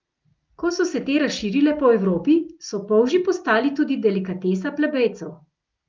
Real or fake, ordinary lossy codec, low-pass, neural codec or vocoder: real; Opus, 24 kbps; 7.2 kHz; none